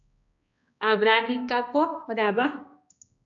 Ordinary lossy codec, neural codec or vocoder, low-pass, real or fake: AAC, 64 kbps; codec, 16 kHz, 1 kbps, X-Codec, HuBERT features, trained on balanced general audio; 7.2 kHz; fake